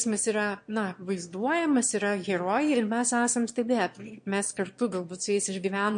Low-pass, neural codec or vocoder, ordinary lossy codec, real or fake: 9.9 kHz; autoencoder, 22.05 kHz, a latent of 192 numbers a frame, VITS, trained on one speaker; MP3, 48 kbps; fake